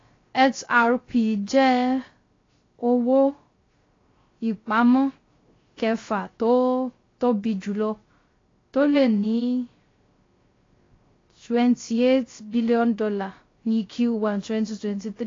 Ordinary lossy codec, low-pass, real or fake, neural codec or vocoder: AAC, 32 kbps; 7.2 kHz; fake; codec, 16 kHz, 0.3 kbps, FocalCodec